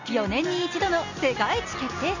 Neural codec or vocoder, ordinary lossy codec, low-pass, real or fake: none; none; 7.2 kHz; real